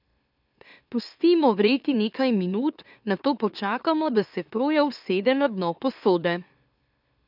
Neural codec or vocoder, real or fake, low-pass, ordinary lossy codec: autoencoder, 44.1 kHz, a latent of 192 numbers a frame, MeloTTS; fake; 5.4 kHz; none